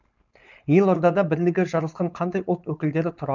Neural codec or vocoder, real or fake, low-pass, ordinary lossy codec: codec, 16 kHz, 4.8 kbps, FACodec; fake; 7.2 kHz; none